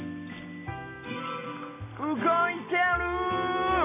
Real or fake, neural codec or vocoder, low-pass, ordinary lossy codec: real; none; 3.6 kHz; AAC, 16 kbps